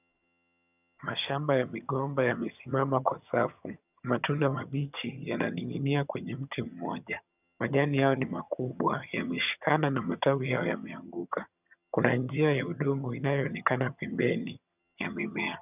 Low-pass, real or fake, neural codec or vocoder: 3.6 kHz; fake; vocoder, 22.05 kHz, 80 mel bands, HiFi-GAN